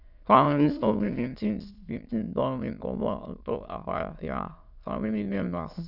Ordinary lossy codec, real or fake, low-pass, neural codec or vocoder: none; fake; 5.4 kHz; autoencoder, 22.05 kHz, a latent of 192 numbers a frame, VITS, trained on many speakers